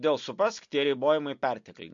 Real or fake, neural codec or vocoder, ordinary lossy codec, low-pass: real; none; MP3, 48 kbps; 7.2 kHz